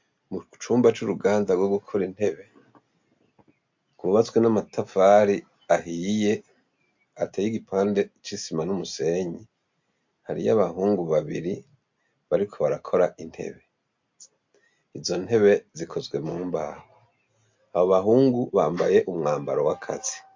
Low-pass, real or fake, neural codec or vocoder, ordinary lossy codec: 7.2 kHz; real; none; MP3, 48 kbps